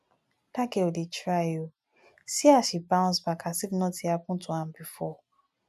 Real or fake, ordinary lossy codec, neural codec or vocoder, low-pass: real; none; none; 14.4 kHz